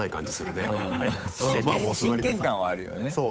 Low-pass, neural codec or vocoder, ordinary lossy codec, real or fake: none; codec, 16 kHz, 8 kbps, FunCodec, trained on Chinese and English, 25 frames a second; none; fake